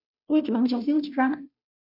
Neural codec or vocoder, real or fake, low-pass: codec, 16 kHz, 0.5 kbps, FunCodec, trained on Chinese and English, 25 frames a second; fake; 5.4 kHz